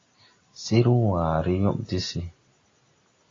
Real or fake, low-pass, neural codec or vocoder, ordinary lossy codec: real; 7.2 kHz; none; AAC, 32 kbps